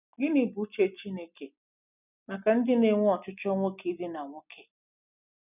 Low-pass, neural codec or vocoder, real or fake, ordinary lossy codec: 3.6 kHz; none; real; none